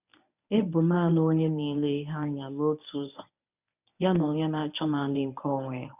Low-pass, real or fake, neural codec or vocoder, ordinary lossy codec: 3.6 kHz; fake; codec, 24 kHz, 0.9 kbps, WavTokenizer, medium speech release version 1; none